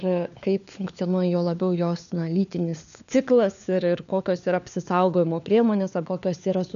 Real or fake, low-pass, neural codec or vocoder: fake; 7.2 kHz; codec, 16 kHz, 4 kbps, FunCodec, trained on LibriTTS, 50 frames a second